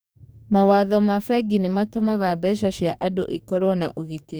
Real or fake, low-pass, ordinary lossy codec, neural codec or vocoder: fake; none; none; codec, 44.1 kHz, 2.6 kbps, DAC